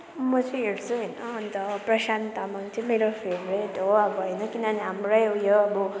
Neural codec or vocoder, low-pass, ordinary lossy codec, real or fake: none; none; none; real